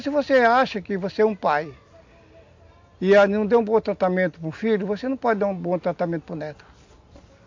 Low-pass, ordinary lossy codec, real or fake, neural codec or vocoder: 7.2 kHz; MP3, 48 kbps; real; none